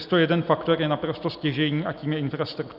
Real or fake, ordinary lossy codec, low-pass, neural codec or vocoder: real; Opus, 64 kbps; 5.4 kHz; none